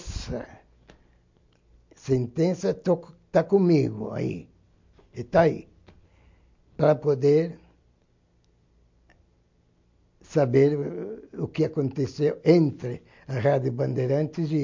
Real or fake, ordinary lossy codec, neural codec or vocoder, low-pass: real; MP3, 48 kbps; none; 7.2 kHz